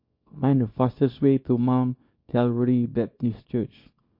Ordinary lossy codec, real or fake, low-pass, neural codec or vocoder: MP3, 32 kbps; fake; 5.4 kHz; codec, 24 kHz, 0.9 kbps, WavTokenizer, small release